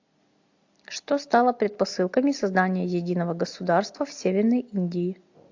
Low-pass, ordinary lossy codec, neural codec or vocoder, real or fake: 7.2 kHz; AAC, 48 kbps; none; real